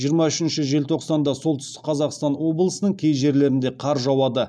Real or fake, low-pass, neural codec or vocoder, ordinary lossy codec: real; none; none; none